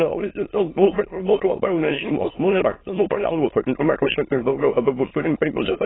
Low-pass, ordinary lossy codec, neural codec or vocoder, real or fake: 7.2 kHz; AAC, 16 kbps; autoencoder, 22.05 kHz, a latent of 192 numbers a frame, VITS, trained on many speakers; fake